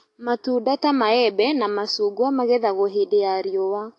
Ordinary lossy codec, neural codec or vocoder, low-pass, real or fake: AAC, 48 kbps; none; 10.8 kHz; real